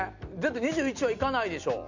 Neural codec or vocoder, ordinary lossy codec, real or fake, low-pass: none; none; real; 7.2 kHz